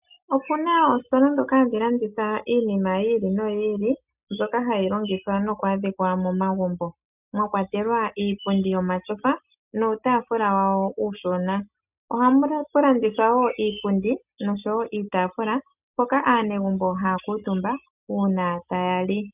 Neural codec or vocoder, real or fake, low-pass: none; real; 3.6 kHz